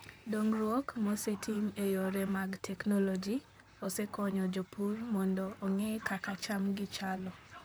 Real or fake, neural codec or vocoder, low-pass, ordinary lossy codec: fake; vocoder, 44.1 kHz, 128 mel bands, Pupu-Vocoder; none; none